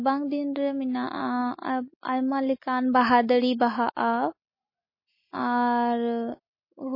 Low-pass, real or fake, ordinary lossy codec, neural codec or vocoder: 5.4 kHz; real; MP3, 24 kbps; none